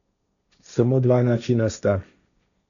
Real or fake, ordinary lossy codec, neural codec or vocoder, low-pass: fake; MP3, 96 kbps; codec, 16 kHz, 1.1 kbps, Voila-Tokenizer; 7.2 kHz